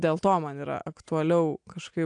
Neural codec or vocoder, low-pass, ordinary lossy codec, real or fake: none; 9.9 kHz; AAC, 64 kbps; real